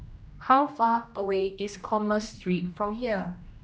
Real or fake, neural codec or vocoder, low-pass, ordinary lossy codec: fake; codec, 16 kHz, 1 kbps, X-Codec, HuBERT features, trained on general audio; none; none